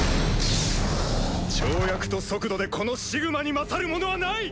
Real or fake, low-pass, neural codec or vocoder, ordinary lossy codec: real; none; none; none